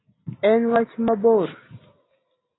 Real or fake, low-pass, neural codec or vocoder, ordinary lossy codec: real; 7.2 kHz; none; AAC, 16 kbps